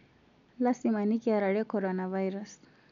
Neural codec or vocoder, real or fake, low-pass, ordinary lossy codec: none; real; 7.2 kHz; none